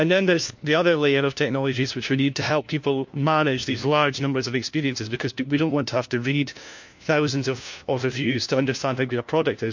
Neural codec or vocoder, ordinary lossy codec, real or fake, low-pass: codec, 16 kHz, 1 kbps, FunCodec, trained on LibriTTS, 50 frames a second; MP3, 48 kbps; fake; 7.2 kHz